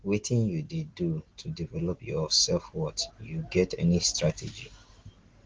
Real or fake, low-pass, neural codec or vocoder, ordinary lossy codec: real; 7.2 kHz; none; Opus, 16 kbps